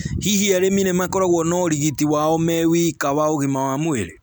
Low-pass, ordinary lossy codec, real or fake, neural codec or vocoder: none; none; real; none